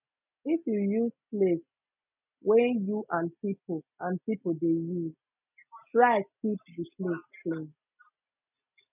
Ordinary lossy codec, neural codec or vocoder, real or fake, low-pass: none; none; real; 3.6 kHz